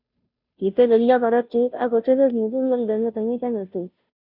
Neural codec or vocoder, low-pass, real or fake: codec, 16 kHz, 0.5 kbps, FunCodec, trained on Chinese and English, 25 frames a second; 5.4 kHz; fake